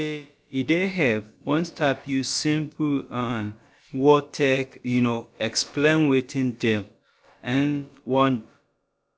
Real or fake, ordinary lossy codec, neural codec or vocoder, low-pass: fake; none; codec, 16 kHz, about 1 kbps, DyCAST, with the encoder's durations; none